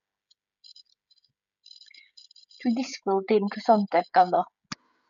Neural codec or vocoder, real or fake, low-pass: codec, 16 kHz, 16 kbps, FreqCodec, smaller model; fake; 7.2 kHz